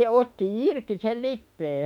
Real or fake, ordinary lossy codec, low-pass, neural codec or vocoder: fake; MP3, 96 kbps; 19.8 kHz; autoencoder, 48 kHz, 32 numbers a frame, DAC-VAE, trained on Japanese speech